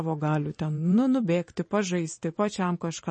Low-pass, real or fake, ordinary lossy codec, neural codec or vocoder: 10.8 kHz; fake; MP3, 32 kbps; vocoder, 44.1 kHz, 128 mel bands every 512 samples, BigVGAN v2